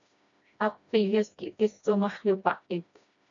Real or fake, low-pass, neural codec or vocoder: fake; 7.2 kHz; codec, 16 kHz, 1 kbps, FreqCodec, smaller model